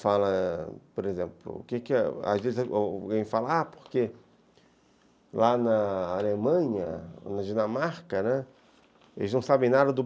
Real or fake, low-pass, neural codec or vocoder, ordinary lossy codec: real; none; none; none